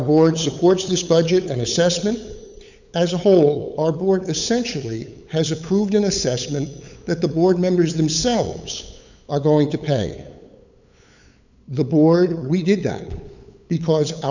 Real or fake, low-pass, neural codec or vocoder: fake; 7.2 kHz; codec, 16 kHz, 8 kbps, FunCodec, trained on LibriTTS, 25 frames a second